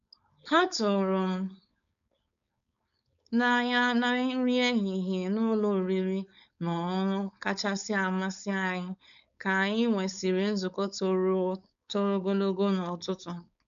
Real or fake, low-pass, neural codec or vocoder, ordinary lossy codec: fake; 7.2 kHz; codec, 16 kHz, 4.8 kbps, FACodec; none